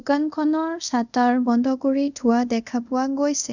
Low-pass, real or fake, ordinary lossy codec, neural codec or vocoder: 7.2 kHz; fake; none; codec, 24 kHz, 0.5 kbps, DualCodec